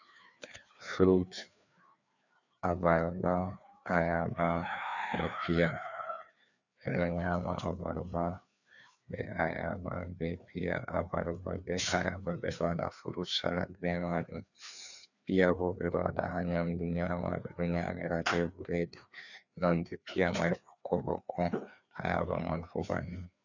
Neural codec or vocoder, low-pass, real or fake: codec, 16 kHz, 2 kbps, FreqCodec, larger model; 7.2 kHz; fake